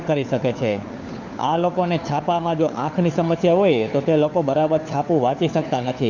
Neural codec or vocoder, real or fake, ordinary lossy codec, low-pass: codec, 16 kHz, 4 kbps, FunCodec, trained on LibriTTS, 50 frames a second; fake; none; 7.2 kHz